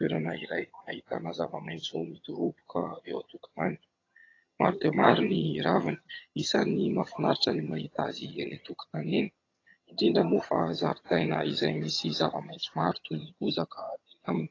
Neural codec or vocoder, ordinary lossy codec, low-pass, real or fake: vocoder, 22.05 kHz, 80 mel bands, HiFi-GAN; AAC, 32 kbps; 7.2 kHz; fake